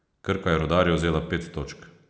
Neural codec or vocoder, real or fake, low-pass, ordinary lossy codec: none; real; none; none